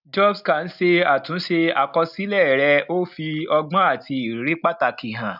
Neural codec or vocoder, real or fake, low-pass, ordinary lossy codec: none; real; 5.4 kHz; none